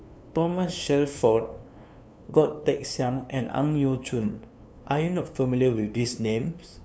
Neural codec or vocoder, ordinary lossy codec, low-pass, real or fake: codec, 16 kHz, 2 kbps, FunCodec, trained on LibriTTS, 25 frames a second; none; none; fake